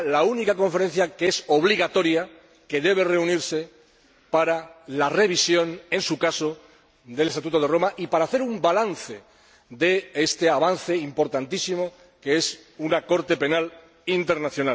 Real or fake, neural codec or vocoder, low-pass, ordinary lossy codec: real; none; none; none